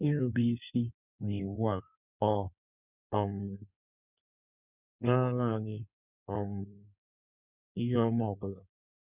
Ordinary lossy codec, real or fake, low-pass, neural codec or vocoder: none; fake; 3.6 kHz; codec, 16 kHz in and 24 kHz out, 1.1 kbps, FireRedTTS-2 codec